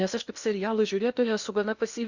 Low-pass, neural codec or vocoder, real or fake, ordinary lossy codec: 7.2 kHz; codec, 16 kHz in and 24 kHz out, 0.8 kbps, FocalCodec, streaming, 65536 codes; fake; Opus, 64 kbps